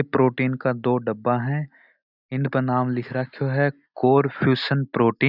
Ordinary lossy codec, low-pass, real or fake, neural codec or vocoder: none; 5.4 kHz; real; none